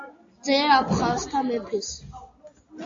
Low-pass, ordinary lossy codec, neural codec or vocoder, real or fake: 7.2 kHz; AAC, 48 kbps; none; real